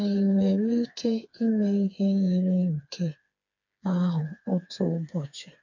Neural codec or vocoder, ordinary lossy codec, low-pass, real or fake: codec, 16 kHz, 4 kbps, FreqCodec, smaller model; none; 7.2 kHz; fake